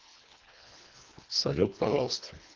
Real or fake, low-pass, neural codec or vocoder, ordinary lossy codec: fake; 7.2 kHz; codec, 24 kHz, 1.5 kbps, HILCodec; Opus, 32 kbps